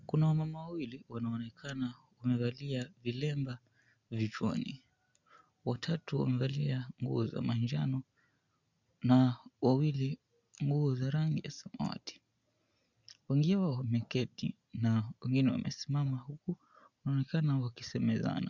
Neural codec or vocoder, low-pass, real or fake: none; 7.2 kHz; real